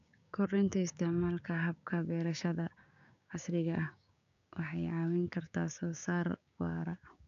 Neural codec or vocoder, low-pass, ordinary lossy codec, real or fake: codec, 16 kHz, 6 kbps, DAC; 7.2 kHz; none; fake